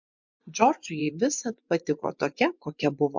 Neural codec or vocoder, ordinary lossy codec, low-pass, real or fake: vocoder, 24 kHz, 100 mel bands, Vocos; MP3, 64 kbps; 7.2 kHz; fake